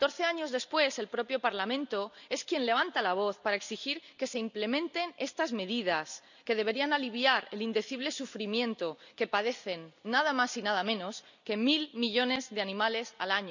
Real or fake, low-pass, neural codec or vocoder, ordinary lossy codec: real; 7.2 kHz; none; none